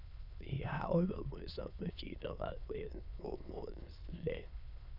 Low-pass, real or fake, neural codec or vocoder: 5.4 kHz; fake; autoencoder, 22.05 kHz, a latent of 192 numbers a frame, VITS, trained on many speakers